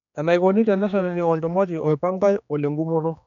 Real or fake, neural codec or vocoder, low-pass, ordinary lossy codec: fake; codec, 16 kHz, 2 kbps, X-Codec, HuBERT features, trained on general audio; 7.2 kHz; none